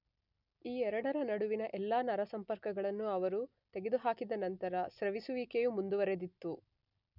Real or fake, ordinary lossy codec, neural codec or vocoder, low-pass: real; none; none; 5.4 kHz